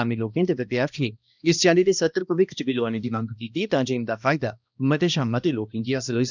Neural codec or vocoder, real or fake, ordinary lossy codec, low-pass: codec, 16 kHz, 1 kbps, X-Codec, HuBERT features, trained on balanced general audio; fake; none; 7.2 kHz